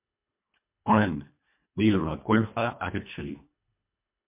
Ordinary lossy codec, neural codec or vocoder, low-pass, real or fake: MP3, 32 kbps; codec, 24 kHz, 1.5 kbps, HILCodec; 3.6 kHz; fake